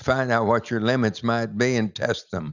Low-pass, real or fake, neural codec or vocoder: 7.2 kHz; real; none